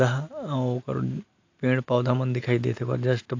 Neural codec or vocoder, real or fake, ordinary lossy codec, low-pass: none; real; AAC, 48 kbps; 7.2 kHz